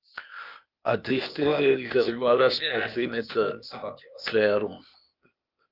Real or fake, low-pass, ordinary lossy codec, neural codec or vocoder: fake; 5.4 kHz; Opus, 24 kbps; codec, 16 kHz, 0.8 kbps, ZipCodec